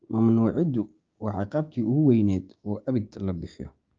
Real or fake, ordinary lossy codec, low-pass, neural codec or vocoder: fake; Opus, 24 kbps; 7.2 kHz; codec, 16 kHz, 6 kbps, DAC